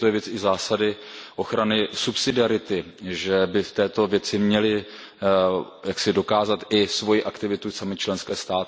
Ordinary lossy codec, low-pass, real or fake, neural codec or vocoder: none; none; real; none